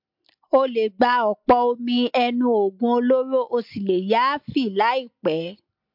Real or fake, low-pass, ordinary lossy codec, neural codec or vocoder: real; 5.4 kHz; MP3, 48 kbps; none